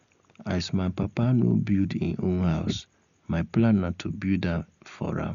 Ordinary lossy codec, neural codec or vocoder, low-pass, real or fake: none; none; 7.2 kHz; real